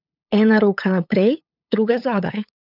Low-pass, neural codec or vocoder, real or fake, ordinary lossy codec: 5.4 kHz; codec, 16 kHz, 8 kbps, FunCodec, trained on LibriTTS, 25 frames a second; fake; none